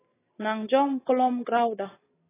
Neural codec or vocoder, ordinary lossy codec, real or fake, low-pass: none; AAC, 16 kbps; real; 3.6 kHz